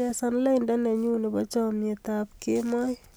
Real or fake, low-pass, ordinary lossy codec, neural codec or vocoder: real; none; none; none